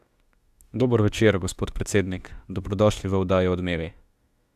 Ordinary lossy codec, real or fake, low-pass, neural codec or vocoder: Opus, 64 kbps; fake; 14.4 kHz; autoencoder, 48 kHz, 32 numbers a frame, DAC-VAE, trained on Japanese speech